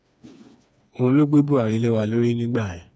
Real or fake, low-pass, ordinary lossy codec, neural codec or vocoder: fake; none; none; codec, 16 kHz, 4 kbps, FreqCodec, smaller model